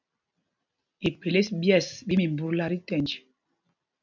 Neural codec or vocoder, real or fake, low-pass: none; real; 7.2 kHz